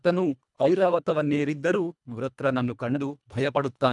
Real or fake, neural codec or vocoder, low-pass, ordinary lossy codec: fake; codec, 24 kHz, 1.5 kbps, HILCodec; 10.8 kHz; none